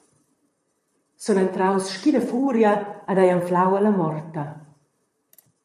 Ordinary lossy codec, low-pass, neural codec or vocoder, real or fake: MP3, 64 kbps; 14.4 kHz; vocoder, 44.1 kHz, 128 mel bands, Pupu-Vocoder; fake